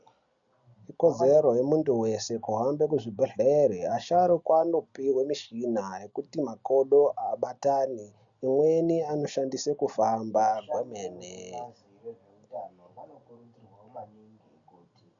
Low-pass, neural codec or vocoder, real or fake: 7.2 kHz; none; real